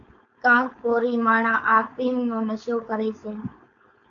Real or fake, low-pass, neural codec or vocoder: fake; 7.2 kHz; codec, 16 kHz, 4.8 kbps, FACodec